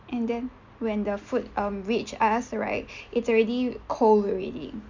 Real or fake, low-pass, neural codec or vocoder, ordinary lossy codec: real; 7.2 kHz; none; MP3, 64 kbps